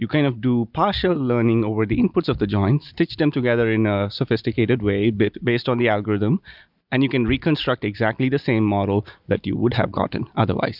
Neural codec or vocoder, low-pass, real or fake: none; 5.4 kHz; real